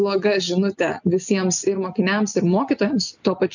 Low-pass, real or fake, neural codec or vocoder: 7.2 kHz; real; none